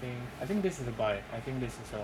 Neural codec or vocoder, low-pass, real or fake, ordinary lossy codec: codec, 44.1 kHz, 7.8 kbps, Pupu-Codec; 19.8 kHz; fake; none